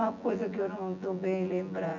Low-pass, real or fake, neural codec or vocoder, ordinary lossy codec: 7.2 kHz; fake; vocoder, 24 kHz, 100 mel bands, Vocos; none